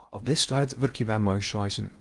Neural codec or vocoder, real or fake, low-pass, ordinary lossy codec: codec, 16 kHz in and 24 kHz out, 0.6 kbps, FocalCodec, streaming, 2048 codes; fake; 10.8 kHz; Opus, 32 kbps